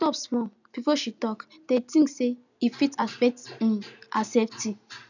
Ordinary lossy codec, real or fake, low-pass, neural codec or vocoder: none; real; 7.2 kHz; none